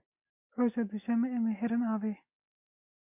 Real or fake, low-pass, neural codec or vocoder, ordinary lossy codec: real; 3.6 kHz; none; MP3, 32 kbps